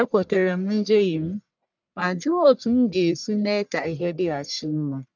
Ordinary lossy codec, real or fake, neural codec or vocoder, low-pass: none; fake; codec, 44.1 kHz, 1.7 kbps, Pupu-Codec; 7.2 kHz